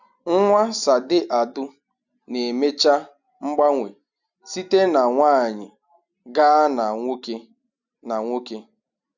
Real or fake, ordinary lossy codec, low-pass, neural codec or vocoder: real; none; 7.2 kHz; none